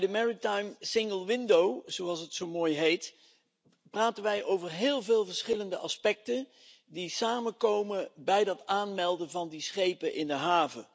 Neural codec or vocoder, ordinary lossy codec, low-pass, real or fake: none; none; none; real